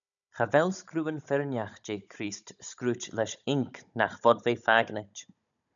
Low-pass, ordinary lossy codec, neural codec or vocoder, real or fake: 7.2 kHz; MP3, 96 kbps; codec, 16 kHz, 16 kbps, FunCodec, trained on Chinese and English, 50 frames a second; fake